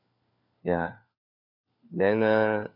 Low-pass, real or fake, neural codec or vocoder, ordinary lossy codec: 5.4 kHz; fake; codec, 16 kHz, 4 kbps, FunCodec, trained on LibriTTS, 50 frames a second; none